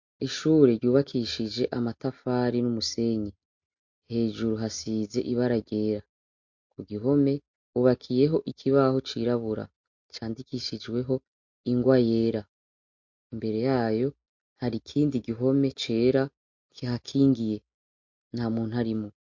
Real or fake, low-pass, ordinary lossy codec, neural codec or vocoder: real; 7.2 kHz; MP3, 48 kbps; none